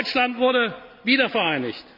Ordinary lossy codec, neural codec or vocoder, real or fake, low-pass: none; none; real; 5.4 kHz